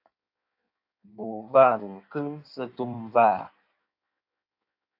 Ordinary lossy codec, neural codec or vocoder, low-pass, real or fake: AAC, 48 kbps; codec, 16 kHz in and 24 kHz out, 1.1 kbps, FireRedTTS-2 codec; 5.4 kHz; fake